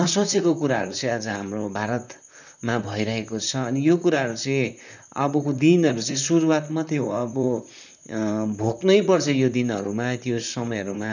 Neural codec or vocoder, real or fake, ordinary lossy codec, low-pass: vocoder, 44.1 kHz, 128 mel bands, Pupu-Vocoder; fake; none; 7.2 kHz